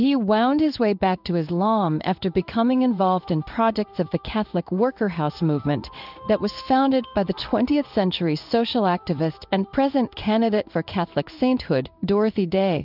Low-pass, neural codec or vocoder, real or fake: 5.4 kHz; codec, 16 kHz in and 24 kHz out, 1 kbps, XY-Tokenizer; fake